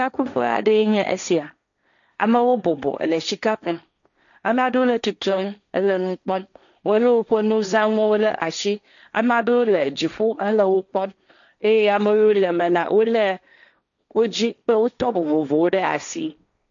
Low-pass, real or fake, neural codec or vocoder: 7.2 kHz; fake; codec, 16 kHz, 1.1 kbps, Voila-Tokenizer